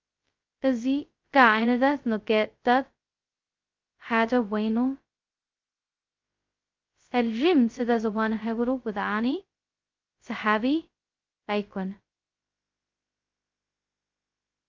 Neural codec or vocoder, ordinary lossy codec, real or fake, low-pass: codec, 16 kHz, 0.2 kbps, FocalCodec; Opus, 24 kbps; fake; 7.2 kHz